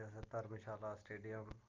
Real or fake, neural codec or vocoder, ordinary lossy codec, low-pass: real; none; Opus, 32 kbps; 7.2 kHz